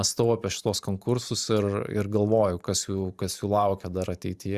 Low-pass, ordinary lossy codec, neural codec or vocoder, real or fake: 14.4 kHz; Opus, 64 kbps; vocoder, 44.1 kHz, 128 mel bands every 512 samples, BigVGAN v2; fake